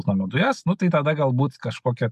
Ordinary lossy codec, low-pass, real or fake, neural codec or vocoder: MP3, 96 kbps; 14.4 kHz; real; none